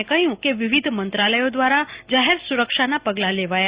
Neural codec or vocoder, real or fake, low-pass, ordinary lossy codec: none; real; 3.6 kHz; Opus, 64 kbps